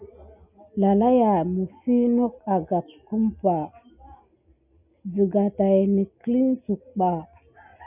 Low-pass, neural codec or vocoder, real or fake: 3.6 kHz; none; real